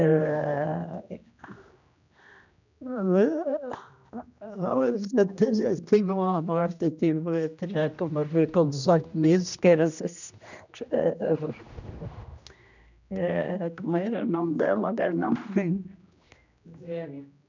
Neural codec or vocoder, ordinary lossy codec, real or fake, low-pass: codec, 16 kHz, 1 kbps, X-Codec, HuBERT features, trained on general audio; none; fake; 7.2 kHz